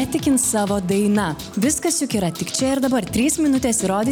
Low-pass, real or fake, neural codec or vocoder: 19.8 kHz; real; none